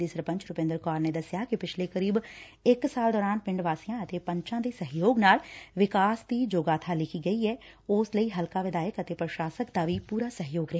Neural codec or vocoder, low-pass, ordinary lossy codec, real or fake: none; none; none; real